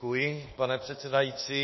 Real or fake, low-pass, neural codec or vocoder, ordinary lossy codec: fake; 7.2 kHz; codec, 24 kHz, 1.2 kbps, DualCodec; MP3, 24 kbps